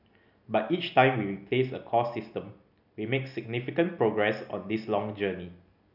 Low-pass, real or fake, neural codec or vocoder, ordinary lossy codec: 5.4 kHz; real; none; none